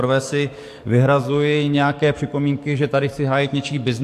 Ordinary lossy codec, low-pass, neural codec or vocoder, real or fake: AAC, 64 kbps; 14.4 kHz; codec, 44.1 kHz, 7.8 kbps, DAC; fake